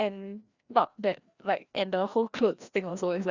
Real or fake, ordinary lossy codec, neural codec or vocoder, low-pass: fake; Opus, 64 kbps; codec, 16 kHz, 1 kbps, FreqCodec, larger model; 7.2 kHz